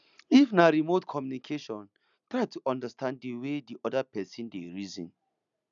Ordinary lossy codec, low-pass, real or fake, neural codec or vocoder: none; 7.2 kHz; real; none